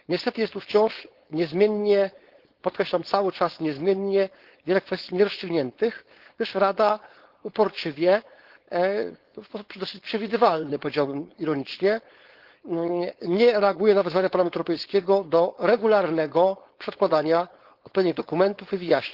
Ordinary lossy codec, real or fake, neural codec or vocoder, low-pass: Opus, 16 kbps; fake; codec, 16 kHz, 4.8 kbps, FACodec; 5.4 kHz